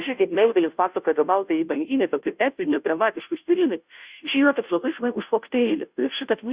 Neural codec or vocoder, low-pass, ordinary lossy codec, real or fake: codec, 16 kHz, 0.5 kbps, FunCodec, trained on Chinese and English, 25 frames a second; 3.6 kHz; Opus, 64 kbps; fake